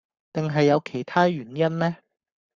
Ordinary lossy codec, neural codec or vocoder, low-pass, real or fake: Opus, 64 kbps; codec, 44.1 kHz, 7.8 kbps, Pupu-Codec; 7.2 kHz; fake